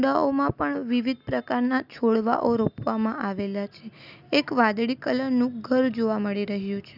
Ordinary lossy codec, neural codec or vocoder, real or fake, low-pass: none; none; real; 5.4 kHz